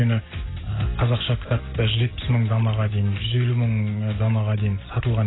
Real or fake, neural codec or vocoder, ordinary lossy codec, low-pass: real; none; AAC, 16 kbps; 7.2 kHz